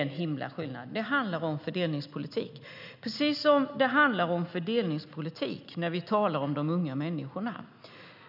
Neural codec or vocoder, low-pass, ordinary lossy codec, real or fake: none; 5.4 kHz; none; real